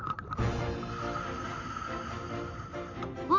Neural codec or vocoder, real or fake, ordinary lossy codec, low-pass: none; real; MP3, 48 kbps; 7.2 kHz